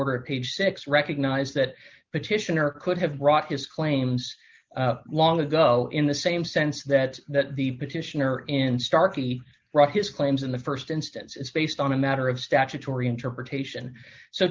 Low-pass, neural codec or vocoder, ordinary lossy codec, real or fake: 7.2 kHz; none; Opus, 32 kbps; real